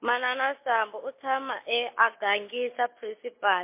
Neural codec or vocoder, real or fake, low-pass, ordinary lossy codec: none; real; 3.6 kHz; MP3, 24 kbps